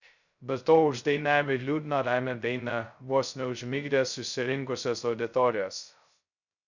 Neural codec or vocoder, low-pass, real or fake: codec, 16 kHz, 0.2 kbps, FocalCodec; 7.2 kHz; fake